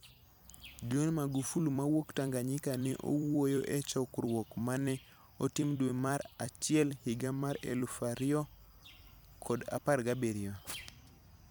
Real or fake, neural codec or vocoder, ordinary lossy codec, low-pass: fake; vocoder, 44.1 kHz, 128 mel bands every 256 samples, BigVGAN v2; none; none